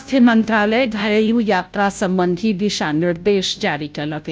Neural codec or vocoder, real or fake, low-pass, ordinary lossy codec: codec, 16 kHz, 0.5 kbps, FunCodec, trained on Chinese and English, 25 frames a second; fake; none; none